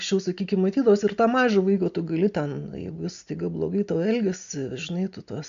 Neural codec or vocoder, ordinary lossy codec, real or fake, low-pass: none; MP3, 64 kbps; real; 7.2 kHz